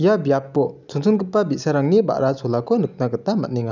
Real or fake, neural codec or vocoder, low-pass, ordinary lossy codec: real; none; 7.2 kHz; none